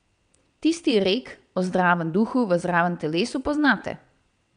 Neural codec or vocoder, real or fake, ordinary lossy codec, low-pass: vocoder, 22.05 kHz, 80 mel bands, WaveNeXt; fake; none; 9.9 kHz